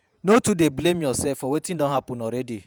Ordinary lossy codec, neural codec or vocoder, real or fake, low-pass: none; vocoder, 48 kHz, 128 mel bands, Vocos; fake; none